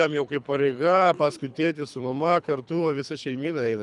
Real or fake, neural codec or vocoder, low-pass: fake; codec, 24 kHz, 3 kbps, HILCodec; 10.8 kHz